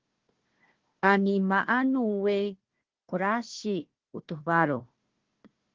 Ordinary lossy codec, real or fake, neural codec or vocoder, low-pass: Opus, 16 kbps; fake; codec, 16 kHz, 1 kbps, FunCodec, trained on Chinese and English, 50 frames a second; 7.2 kHz